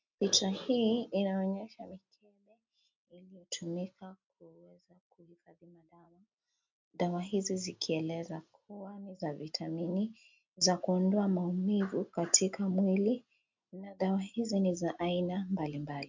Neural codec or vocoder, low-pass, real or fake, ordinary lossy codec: none; 7.2 kHz; real; MP3, 64 kbps